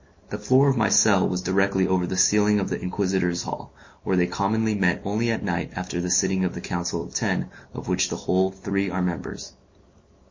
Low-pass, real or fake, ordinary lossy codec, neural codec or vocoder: 7.2 kHz; real; MP3, 32 kbps; none